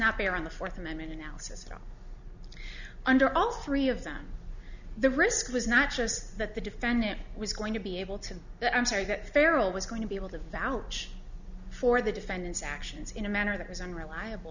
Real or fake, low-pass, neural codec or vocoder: real; 7.2 kHz; none